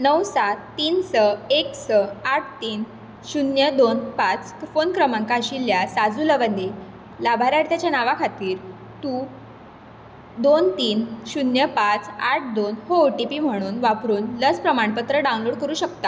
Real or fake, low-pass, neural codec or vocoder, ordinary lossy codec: real; none; none; none